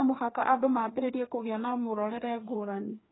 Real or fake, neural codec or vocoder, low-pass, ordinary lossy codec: fake; codec, 16 kHz, 2 kbps, FreqCodec, larger model; 7.2 kHz; AAC, 16 kbps